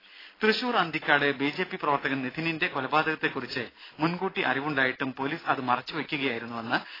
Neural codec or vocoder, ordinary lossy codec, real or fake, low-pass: none; AAC, 24 kbps; real; 5.4 kHz